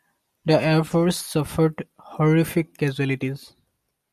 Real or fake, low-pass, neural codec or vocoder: real; 14.4 kHz; none